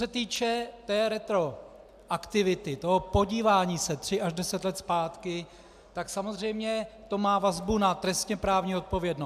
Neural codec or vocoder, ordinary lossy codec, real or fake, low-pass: none; AAC, 96 kbps; real; 14.4 kHz